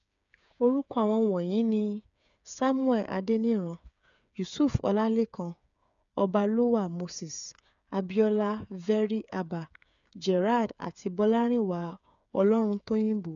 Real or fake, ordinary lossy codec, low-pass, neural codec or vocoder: fake; none; 7.2 kHz; codec, 16 kHz, 8 kbps, FreqCodec, smaller model